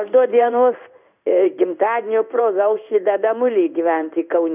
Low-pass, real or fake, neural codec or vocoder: 3.6 kHz; fake; codec, 16 kHz in and 24 kHz out, 1 kbps, XY-Tokenizer